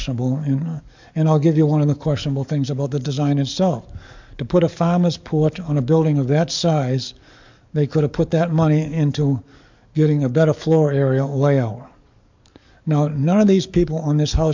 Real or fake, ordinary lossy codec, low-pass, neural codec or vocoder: fake; MP3, 64 kbps; 7.2 kHz; codec, 44.1 kHz, 7.8 kbps, DAC